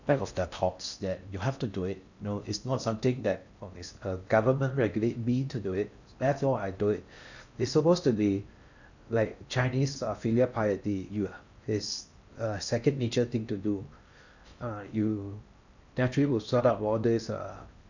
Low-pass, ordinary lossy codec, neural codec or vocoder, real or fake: 7.2 kHz; none; codec, 16 kHz in and 24 kHz out, 0.6 kbps, FocalCodec, streaming, 4096 codes; fake